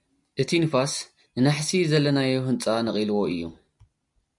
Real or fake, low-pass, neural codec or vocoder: real; 10.8 kHz; none